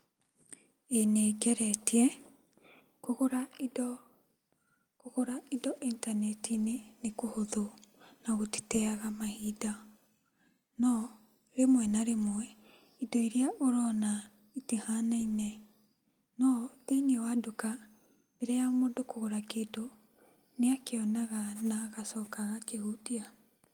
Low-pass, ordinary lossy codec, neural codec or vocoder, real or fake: 19.8 kHz; Opus, 32 kbps; none; real